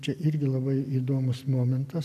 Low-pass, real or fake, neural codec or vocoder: 14.4 kHz; real; none